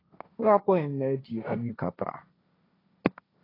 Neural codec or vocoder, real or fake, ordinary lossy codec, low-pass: codec, 16 kHz, 1.1 kbps, Voila-Tokenizer; fake; AAC, 24 kbps; 5.4 kHz